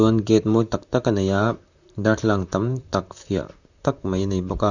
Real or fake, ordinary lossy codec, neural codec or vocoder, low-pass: fake; none; vocoder, 44.1 kHz, 128 mel bands, Pupu-Vocoder; 7.2 kHz